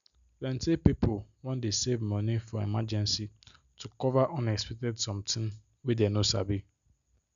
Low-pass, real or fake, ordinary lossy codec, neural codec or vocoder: 7.2 kHz; real; none; none